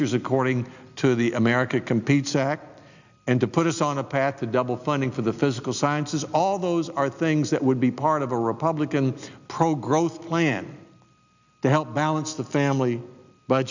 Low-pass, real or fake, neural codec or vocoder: 7.2 kHz; real; none